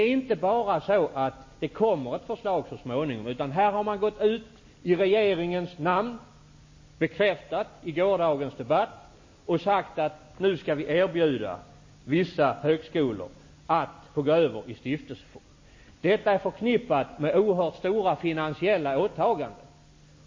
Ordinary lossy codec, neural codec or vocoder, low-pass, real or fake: MP3, 32 kbps; none; 7.2 kHz; real